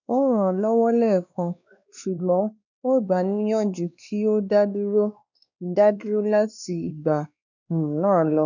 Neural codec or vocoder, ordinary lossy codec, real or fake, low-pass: codec, 16 kHz, 2 kbps, X-Codec, WavLM features, trained on Multilingual LibriSpeech; none; fake; 7.2 kHz